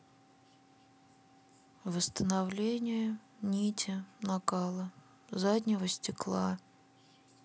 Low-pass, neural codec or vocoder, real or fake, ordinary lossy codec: none; none; real; none